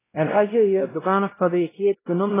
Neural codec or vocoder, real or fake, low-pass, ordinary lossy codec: codec, 16 kHz, 0.5 kbps, X-Codec, WavLM features, trained on Multilingual LibriSpeech; fake; 3.6 kHz; AAC, 16 kbps